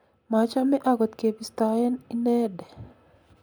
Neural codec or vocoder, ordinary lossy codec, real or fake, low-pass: none; none; real; none